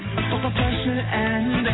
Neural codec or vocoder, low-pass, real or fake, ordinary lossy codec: none; 7.2 kHz; real; AAC, 16 kbps